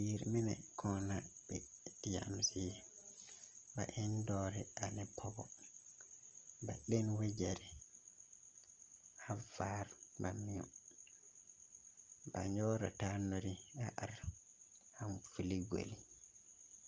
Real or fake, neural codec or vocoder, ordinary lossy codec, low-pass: real; none; Opus, 24 kbps; 7.2 kHz